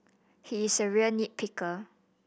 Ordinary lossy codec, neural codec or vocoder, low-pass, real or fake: none; none; none; real